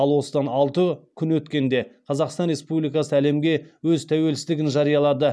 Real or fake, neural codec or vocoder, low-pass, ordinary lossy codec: real; none; none; none